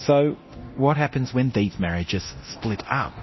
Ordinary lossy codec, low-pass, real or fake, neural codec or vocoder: MP3, 24 kbps; 7.2 kHz; fake; codec, 24 kHz, 0.9 kbps, DualCodec